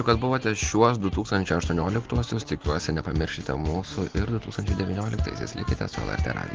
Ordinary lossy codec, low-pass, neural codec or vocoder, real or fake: Opus, 16 kbps; 7.2 kHz; none; real